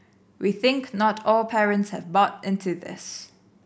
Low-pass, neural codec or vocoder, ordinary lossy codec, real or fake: none; none; none; real